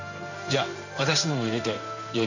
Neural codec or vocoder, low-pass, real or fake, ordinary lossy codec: codec, 16 kHz in and 24 kHz out, 1 kbps, XY-Tokenizer; 7.2 kHz; fake; none